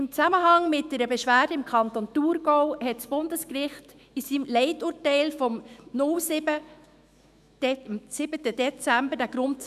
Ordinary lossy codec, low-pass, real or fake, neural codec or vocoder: none; 14.4 kHz; fake; autoencoder, 48 kHz, 128 numbers a frame, DAC-VAE, trained on Japanese speech